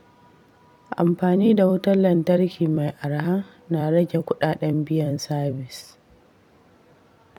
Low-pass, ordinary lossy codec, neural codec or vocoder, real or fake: 19.8 kHz; none; vocoder, 44.1 kHz, 128 mel bands every 256 samples, BigVGAN v2; fake